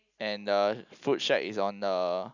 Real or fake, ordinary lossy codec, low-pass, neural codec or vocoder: real; none; 7.2 kHz; none